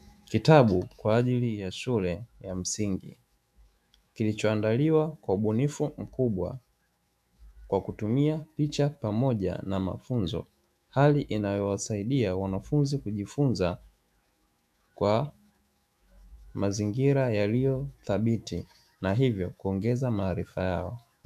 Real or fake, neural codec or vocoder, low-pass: fake; autoencoder, 48 kHz, 128 numbers a frame, DAC-VAE, trained on Japanese speech; 14.4 kHz